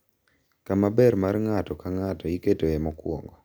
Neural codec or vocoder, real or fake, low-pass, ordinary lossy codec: none; real; none; none